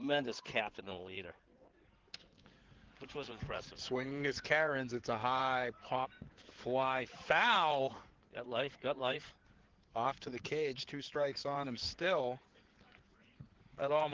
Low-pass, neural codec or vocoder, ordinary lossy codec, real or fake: 7.2 kHz; codec, 16 kHz in and 24 kHz out, 2.2 kbps, FireRedTTS-2 codec; Opus, 16 kbps; fake